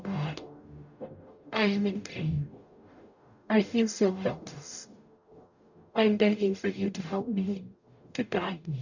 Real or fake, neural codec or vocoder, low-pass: fake; codec, 44.1 kHz, 0.9 kbps, DAC; 7.2 kHz